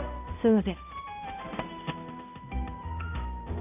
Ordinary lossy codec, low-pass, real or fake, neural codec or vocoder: none; 3.6 kHz; fake; codec, 16 kHz, 0.5 kbps, X-Codec, HuBERT features, trained on balanced general audio